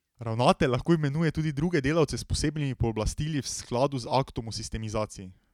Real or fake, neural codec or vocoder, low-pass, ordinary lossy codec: real; none; 19.8 kHz; none